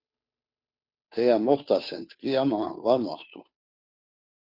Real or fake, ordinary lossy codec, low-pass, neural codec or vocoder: fake; Opus, 64 kbps; 5.4 kHz; codec, 16 kHz, 2 kbps, FunCodec, trained on Chinese and English, 25 frames a second